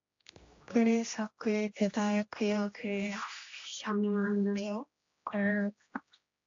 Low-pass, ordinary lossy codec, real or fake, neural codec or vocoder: 7.2 kHz; AAC, 48 kbps; fake; codec, 16 kHz, 1 kbps, X-Codec, HuBERT features, trained on general audio